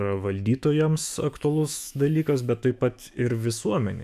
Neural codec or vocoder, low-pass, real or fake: autoencoder, 48 kHz, 128 numbers a frame, DAC-VAE, trained on Japanese speech; 14.4 kHz; fake